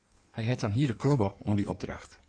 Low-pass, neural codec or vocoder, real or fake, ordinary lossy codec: 9.9 kHz; codec, 16 kHz in and 24 kHz out, 1.1 kbps, FireRedTTS-2 codec; fake; AAC, 64 kbps